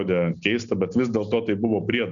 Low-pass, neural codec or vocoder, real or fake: 7.2 kHz; none; real